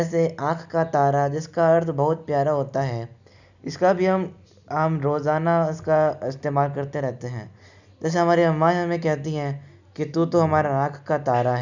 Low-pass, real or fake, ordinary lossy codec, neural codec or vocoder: 7.2 kHz; real; none; none